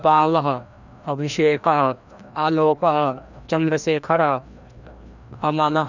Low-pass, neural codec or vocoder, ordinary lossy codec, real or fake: 7.2 kHz; codec, 16 kHz, 1 kbps, FreqCodec, larger model; none; fake